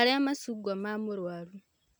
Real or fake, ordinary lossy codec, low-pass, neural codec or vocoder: real; none; none; none